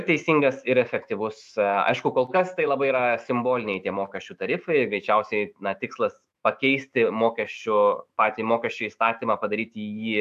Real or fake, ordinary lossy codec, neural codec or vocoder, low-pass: fake; AAC, 96 kbps; autoencoder, 48 kHz, 128 numbers a frame, DAC-VAE, trained on Japanese speech; 14.4 kHz